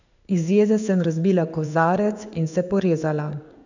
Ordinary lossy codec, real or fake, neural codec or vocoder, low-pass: none; fake; codec, 16 kHz in and 24 kHz out, 1 kbps, XY-Tokenizer; 7.2 kHz